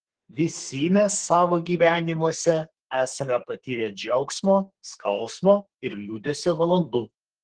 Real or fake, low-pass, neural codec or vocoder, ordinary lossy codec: fake; 9.9 kHz; codec, 32 kHz, 1.9 kbps, SNAC; Opus, 16 kbps